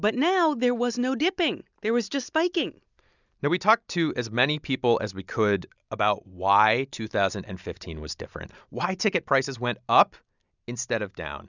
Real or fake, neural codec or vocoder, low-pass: real; none; 7.2 kHz